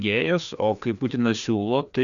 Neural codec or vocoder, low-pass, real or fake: codec, 16 kHz, 2 kbps, FreqCodec, larger model; 7.2 kHz; fake